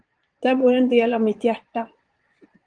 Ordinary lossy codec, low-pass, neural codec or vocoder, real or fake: Opus, 24 kbps; 9.9 kHz; vocoder, 22.05 kHz, 80 mel bands, Vocos; fake